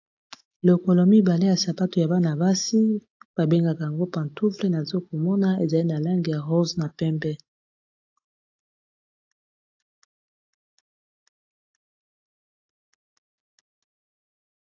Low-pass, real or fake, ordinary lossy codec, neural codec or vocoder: 7.2 kHz; real; AAC, 48 kbps; none